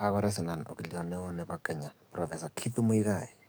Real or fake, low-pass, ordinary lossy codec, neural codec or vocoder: fake; none; none; codec, 44.1 kHz, 7.8 kbps, DAC